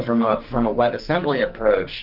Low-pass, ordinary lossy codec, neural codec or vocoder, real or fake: 5.4 kHz; Opus, 32 kbps; codec, 44.1 kHz, 2.6 kbps, SNAC; fake